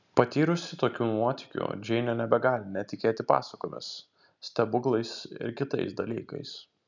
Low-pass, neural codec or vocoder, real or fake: 7.2 kHz; none; real